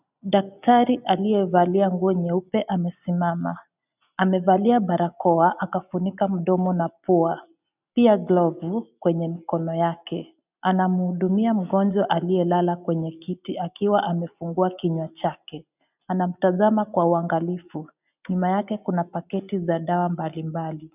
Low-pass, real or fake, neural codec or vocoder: 3.6 kHz; real; none